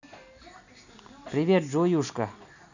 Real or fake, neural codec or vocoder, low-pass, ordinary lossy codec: real; none; 7.2 kHz; none